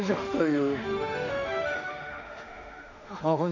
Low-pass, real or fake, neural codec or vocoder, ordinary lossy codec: 7.2 kHz; fake; autoencoder, 48 kHz, 32 numbers a frame, DAC-VAE, trained on Japanese speech; none